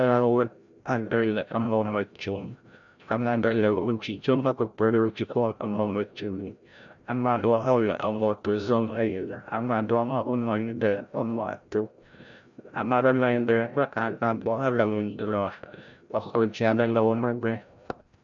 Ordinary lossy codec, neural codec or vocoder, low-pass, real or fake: MP3, 96 kbps; codec, 16 kHz, 0.5 kbps, FreqCodec, larger model; 7.2 kHz; fake